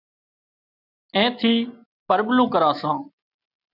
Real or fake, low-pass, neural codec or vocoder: real; 5.4 kHz; none